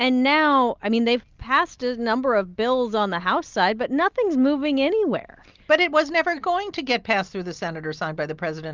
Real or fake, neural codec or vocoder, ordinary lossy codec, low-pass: real; none; Opus, 32 kbps; 7.2 kHz